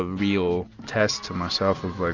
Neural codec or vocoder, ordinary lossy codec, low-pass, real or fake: none; Opus, 64 kbps; 7.2 kHz; real